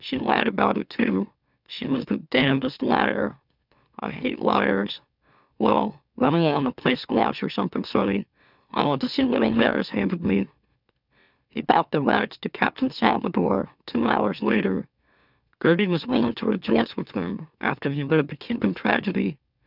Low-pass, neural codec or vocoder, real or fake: 5.4 kHz; autoencoder, 44.1 kHz, a latent of 192 numbers a frame, MeloTTS; fake